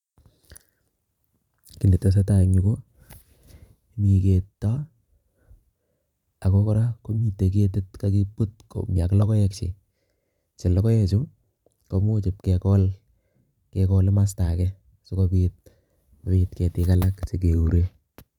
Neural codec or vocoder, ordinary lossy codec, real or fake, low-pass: vocoder, 44.1 kHz, 128 mel bands every 512 samples, BigVGAN v2; none; fake; 19.8 kHz